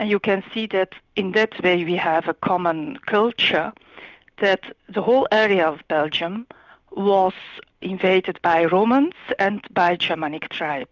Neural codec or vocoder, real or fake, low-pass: none; real; 7.2 kHz